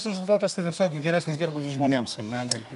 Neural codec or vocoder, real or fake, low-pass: codec, 24 kHz, 1 kbps, SNAC; fake; 10.8 kHz